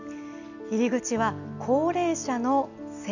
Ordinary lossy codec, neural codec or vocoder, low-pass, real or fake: none; none; 7.2 kHz; real